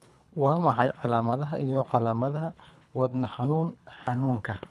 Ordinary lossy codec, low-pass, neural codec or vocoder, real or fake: none; none; codec, 24 kHz, 3 kbps, HILCodec; fake